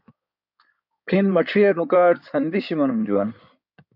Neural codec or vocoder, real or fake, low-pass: codec, 16 kHz in and 24 kHz out, 2.2 kbps, FireRedTTS-2 codec; fake; 5.4 kHz